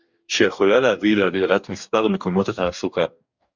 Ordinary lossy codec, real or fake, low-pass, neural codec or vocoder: Opus, 64 kbps; fake; 7.2 kHz; codec, 44.1 kHz, 2.6 kbps, DAC